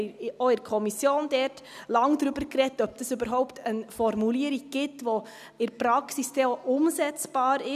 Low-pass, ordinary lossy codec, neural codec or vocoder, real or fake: 14.4 kHz; none; none; real